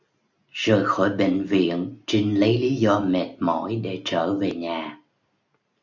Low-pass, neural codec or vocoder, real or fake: 7.2 kHz; none; real